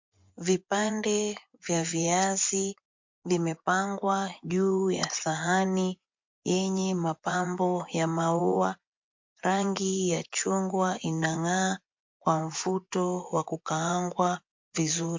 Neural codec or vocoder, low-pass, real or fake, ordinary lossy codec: vocoder, 24 kHz, 100 mel bands, Vocos; 7.2 kHz; fake; MP3, 48 kbps